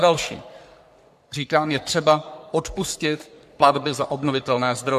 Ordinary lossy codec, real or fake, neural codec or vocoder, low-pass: AAC, 96 kbps; fake; codec, 44.1 kHz, 3.4 kbps, Pupu-Codec; 14.4 kHz